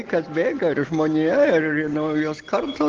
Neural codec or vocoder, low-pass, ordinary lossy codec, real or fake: none; 7.2 kHz; Opus, 16 kbps; real